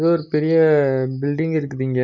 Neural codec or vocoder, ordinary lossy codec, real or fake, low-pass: none; none; real; 7.2 kHz